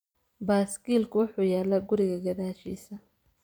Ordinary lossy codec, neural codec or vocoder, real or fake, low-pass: none; vocoder, 44.1 kHz, 128 mel bands every 256 samples, BigVGAN v2; fake; none